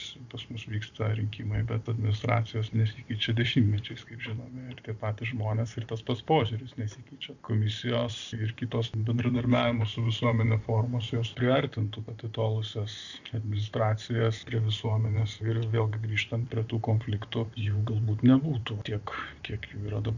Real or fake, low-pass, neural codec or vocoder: fake; 7.2 kHz; vocoder, 44.1 kHz, 128 mel bands every 512 samples, BigVGAN v2